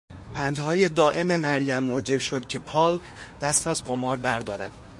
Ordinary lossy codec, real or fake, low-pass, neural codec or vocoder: MP3, 48 kbps; fake; 10.8 kHz; codec, 24 kHz, 1 kbps, SNAC